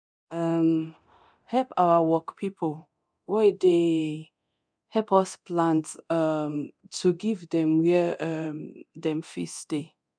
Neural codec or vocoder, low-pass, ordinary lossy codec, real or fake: codec, 24 kHz, 0.9 kbps, DualCodec; 9.9 kHz; none; fake